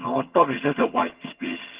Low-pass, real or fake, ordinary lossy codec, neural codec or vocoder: 3.6 kHz; fake; Opus, 16 kbps; vocoder, 22.05 kHz, 80 mel bands, HiFi-GAN